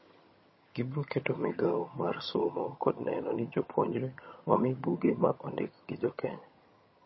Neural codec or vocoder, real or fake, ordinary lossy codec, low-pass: vocoder, 22.05 kHz, 80 mel bands, HiFi-GAN; fake; MP3, 24 kbps; 7.2 kHz